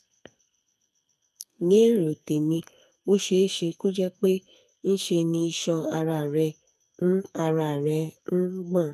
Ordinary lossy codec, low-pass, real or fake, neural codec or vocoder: none; 14.4 kHz; fake; codec, 44.1 kHz, 2.6 kbps, SNAC